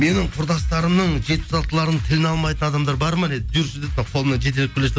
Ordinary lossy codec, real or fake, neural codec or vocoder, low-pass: none; real; none; none